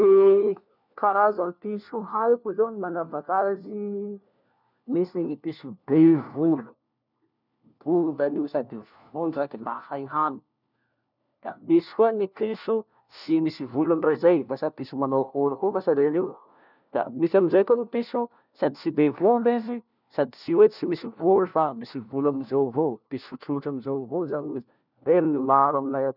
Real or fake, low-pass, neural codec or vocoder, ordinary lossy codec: fake; 5.4 kHz; codec, 16 kHz, 1 kbps, FunCodec, trained on LibriTTS, 50 frames a second; none